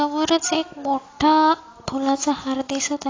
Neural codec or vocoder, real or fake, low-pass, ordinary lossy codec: none; real; 7.2 kHz; AAC, 32 kbps